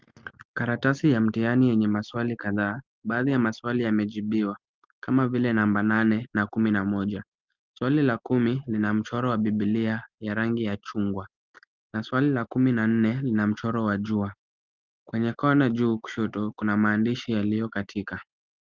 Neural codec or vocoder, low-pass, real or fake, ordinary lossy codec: none; 7.2 kHz; real; Opus, 24 kbps